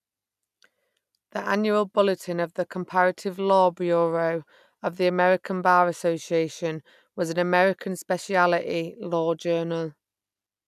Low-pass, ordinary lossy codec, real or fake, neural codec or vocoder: 14.4 kHz; none; real; none